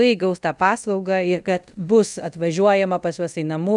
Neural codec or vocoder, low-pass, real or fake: codec, 24 kHz, 0.5 kbps, DualCodec; 10.8 kHz; fake